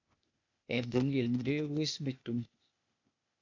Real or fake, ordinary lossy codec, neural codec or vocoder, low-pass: fake; AAC, 48 kbps; codec, 16 kHz, 0.8 kbps, ZipCodec; 7.2 kHz